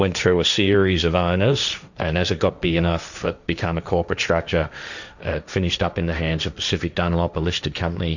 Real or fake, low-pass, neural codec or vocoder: fake; 7.2 kHz; codec, 16 kHz, 1.1 kbps, Voila-Tokenizer